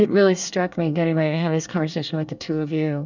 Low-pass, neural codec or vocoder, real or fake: 7.2 kHz; codec, 24 kHz, 1 kbps, SNAC; fake